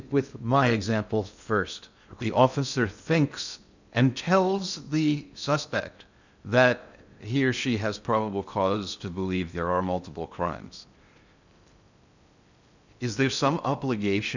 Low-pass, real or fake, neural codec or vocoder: 7.2 kHz; fake; codec, 16 kHz in and 24 kHz out, 0.6 kbps, FocalCodec, streaming, 2048 codes